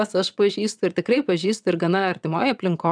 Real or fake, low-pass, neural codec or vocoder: fake; 9.9 kHz; vocoder, 22.05 kHz, 80 mel bands, WaveNeXt